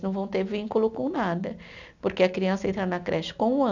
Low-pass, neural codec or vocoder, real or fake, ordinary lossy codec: 7.2 kHz; none; real; none